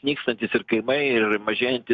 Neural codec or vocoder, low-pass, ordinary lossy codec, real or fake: none; 7.2 kHz; MP3, 64 kbps; real